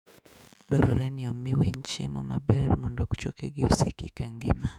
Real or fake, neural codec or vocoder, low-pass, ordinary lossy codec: fake; autoencoder, 48 kHz, 32 numbers a frame, DAC-VAE, trained on Japanese speech; 19.8 kHz; none